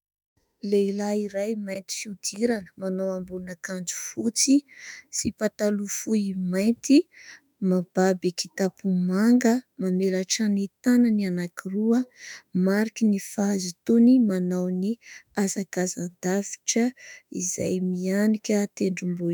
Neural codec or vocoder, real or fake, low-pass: autoencoder, 48 kHz, 32 numbers a frame, DAC-VAE, trained on Japanese speech; fake; 19.8 kHz